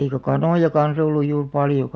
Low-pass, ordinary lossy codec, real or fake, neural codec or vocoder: none; none; real; none